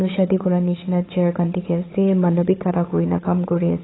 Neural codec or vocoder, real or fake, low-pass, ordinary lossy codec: codec, 16 kHz, 8 kbps, FunCodec, trained on Chinese and English, 25 frames a second; fake; 7.2 kHz; AAC, 16 kbps